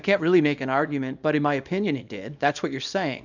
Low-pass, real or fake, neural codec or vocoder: 7.2 kHz; fake; codec, 24 kHz, 0.9 kbps, WavTokenizer, small release